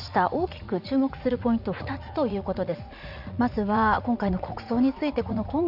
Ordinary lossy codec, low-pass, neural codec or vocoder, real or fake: none; 5.4 kHz; vocoder, 44.1 kHz, 80 mel bands, Vocos; fake